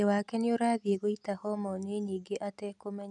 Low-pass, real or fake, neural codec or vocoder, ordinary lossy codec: 10.8 kHz; real; none; none